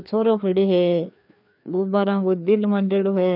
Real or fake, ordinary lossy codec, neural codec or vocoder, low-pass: fake; none; codec, 16 kHz, 2 kbps, FreqCodec, larger model; 5.4 kHz